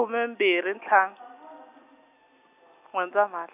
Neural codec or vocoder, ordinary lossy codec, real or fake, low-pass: none; none; real; 3.6 kHz